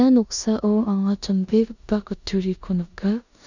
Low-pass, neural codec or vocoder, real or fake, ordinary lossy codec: 7.2 kHz; codec, 16 kHz in and 24 kHz out, 0.9 kbps, LongCat-Audio-Codec, four codebook decoder; fake; none